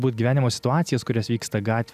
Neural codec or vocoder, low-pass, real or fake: none; 14.4 kHz; real